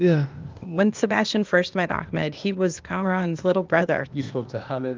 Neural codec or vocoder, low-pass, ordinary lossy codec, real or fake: codec, 16 kHz, 0.8 kbps, ZipCodec; 7.2 kHz; Opus, 32 kbps; fake